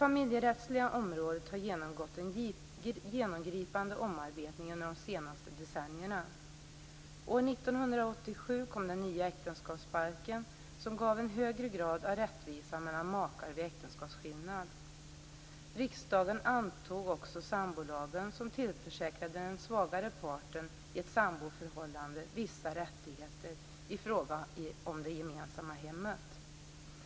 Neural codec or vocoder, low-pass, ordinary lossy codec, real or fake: none; none; none; real